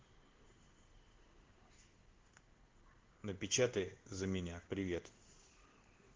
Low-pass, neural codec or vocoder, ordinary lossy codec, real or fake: 7.2 kHz; codec, 16 kHz in and 24 kHz out, 1 kbps, XY-Tokenizer; Opus, 16 kbps; fake